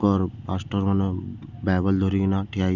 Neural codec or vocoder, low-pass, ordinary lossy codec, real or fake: none; 7.2 kHz; none; real